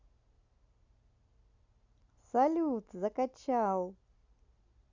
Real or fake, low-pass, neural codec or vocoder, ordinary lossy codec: real; 7.2 kHz; none; Opus, 64 kbps